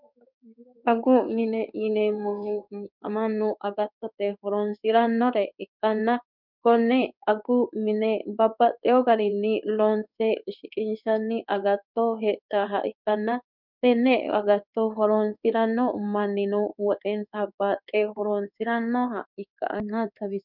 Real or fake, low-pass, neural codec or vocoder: fake; 5.4 kHz; codec, 16 kHz in and 24 kHz out, 1 kbps, XY-Tokenizer